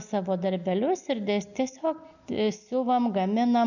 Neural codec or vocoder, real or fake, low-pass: none; real; 7.2 kHz